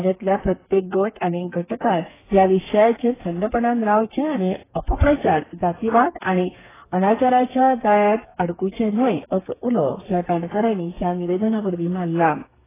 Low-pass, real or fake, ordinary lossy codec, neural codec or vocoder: 3.6 kHz; fake; AAC, 16 kbps; codec, 32 kHz, 1.9 kbps, SNAC